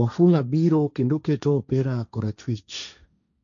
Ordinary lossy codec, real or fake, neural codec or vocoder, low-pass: MP3, 96 kbps; fake; codec, 16 kHz, 1.1 kbps, Voila-Tokenizer; 7.2 kHz